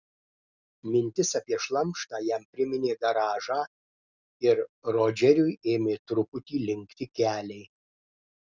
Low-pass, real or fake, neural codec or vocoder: 7.2 kHz; real; none